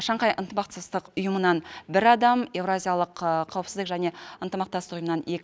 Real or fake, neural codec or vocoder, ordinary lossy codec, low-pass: real; none; none; none